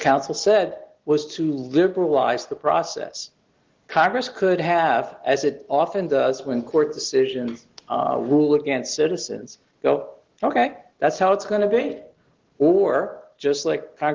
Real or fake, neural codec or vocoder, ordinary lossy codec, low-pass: real; none; Opus, 16 kbps; 7.2 kHz